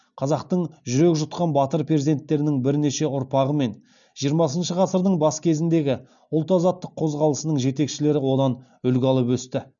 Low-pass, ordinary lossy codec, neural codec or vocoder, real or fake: 7.2 kHz; none; none; real